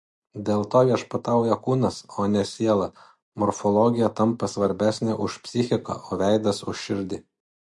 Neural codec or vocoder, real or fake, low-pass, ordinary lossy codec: none; real; 10.8 kHz; MP3, 48 kbps